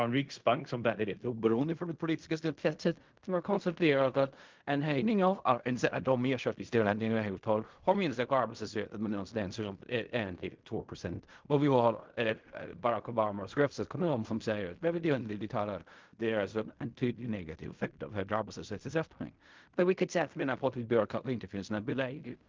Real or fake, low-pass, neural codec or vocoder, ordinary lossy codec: fake; 7.2 kHz; codec, 16 kHz in and 24 kHz out, 0.4 kbps, LongCat-Audio-Codec, fine tuned four codebook decoder; Opus, 24 kbps